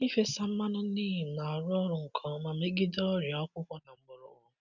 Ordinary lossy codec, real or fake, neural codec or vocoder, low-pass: none; real; none; 7.2 kHz